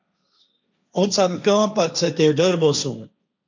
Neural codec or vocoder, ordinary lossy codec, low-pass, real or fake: codec, 16 kHz, 1.1 kbps, Voila-Tokenizer; MP3, 64 kbps; 7.2 kHz; fake